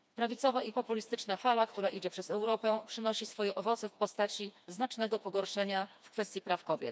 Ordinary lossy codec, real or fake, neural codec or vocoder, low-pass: none; fake; codec, 16 kHz, 2 kbps, FreqCodec, smaller model; none